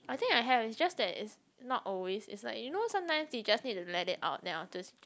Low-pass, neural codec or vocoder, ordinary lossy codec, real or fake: none; none; none; real